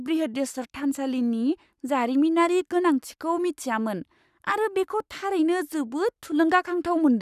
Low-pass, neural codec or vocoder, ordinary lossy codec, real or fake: 14.4 kHz; codec, 44.1 kHz, 7.8 kbps, Pupu-Codec; none; fake